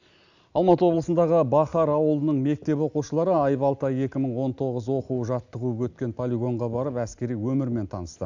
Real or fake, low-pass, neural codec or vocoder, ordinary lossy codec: real; 7.2 kHz; none; none